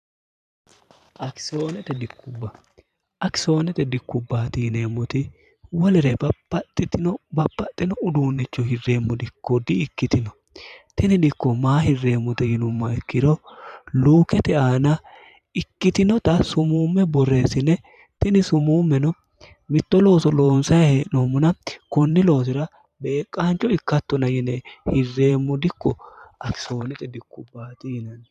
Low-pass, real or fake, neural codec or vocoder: 14.4 kHz; fake; vocoder, 44.1 kHz, 128 mel bands every 512 samples, BigVGAN v2